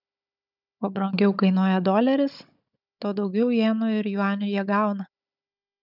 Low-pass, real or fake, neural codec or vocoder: 5.4 kHz; fake; codec, 16 kHz, 16 kbps, FunCodec, trained on Chinese and English, 50 frames a second